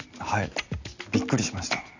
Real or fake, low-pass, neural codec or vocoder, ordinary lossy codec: real; 7.2 kHz; none; none